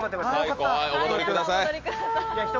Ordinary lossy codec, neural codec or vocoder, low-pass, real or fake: Opus, 32 kbps; none; 7.2 kHz; real